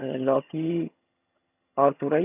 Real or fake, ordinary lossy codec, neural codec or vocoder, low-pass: fake; none; vocoder, 22.05 kHz, 80 mel bands, HiFi-GAN; 3.6 kHz